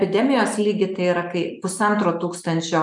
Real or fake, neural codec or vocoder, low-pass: real; none; 10.8 kHz